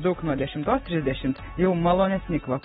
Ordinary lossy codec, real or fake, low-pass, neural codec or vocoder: AAC, 16 kbps; real; 7.2 kHz; none